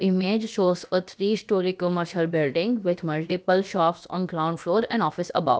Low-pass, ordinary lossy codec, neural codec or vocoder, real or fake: none; none; codec, 16 kHz, about 1 kbps, DyCAST, with the encoder's durations; fake